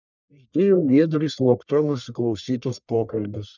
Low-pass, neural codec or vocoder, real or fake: 7.2 kHz; codec, 44.1 kHz, 1.7 kbps, Pupu-Codec; fake